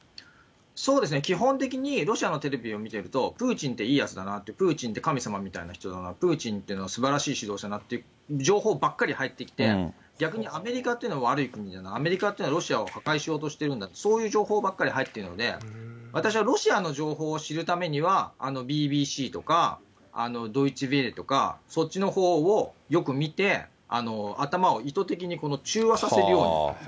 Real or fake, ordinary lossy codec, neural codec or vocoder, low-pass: real; none; none; none